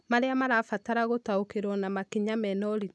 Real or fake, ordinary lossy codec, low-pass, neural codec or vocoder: real; none; none; none